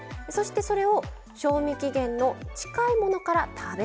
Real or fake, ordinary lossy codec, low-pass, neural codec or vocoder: real; none; none; none